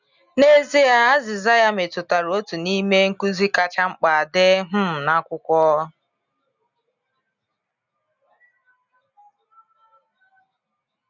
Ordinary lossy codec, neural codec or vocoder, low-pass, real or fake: none; none; 7.2 kHz; real